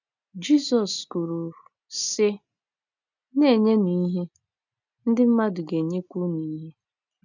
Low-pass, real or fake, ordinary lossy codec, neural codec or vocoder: 7.2 kHz; real; none; none